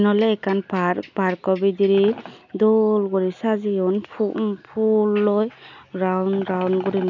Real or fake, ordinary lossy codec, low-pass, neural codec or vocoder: real; none; 7.2 kHz; none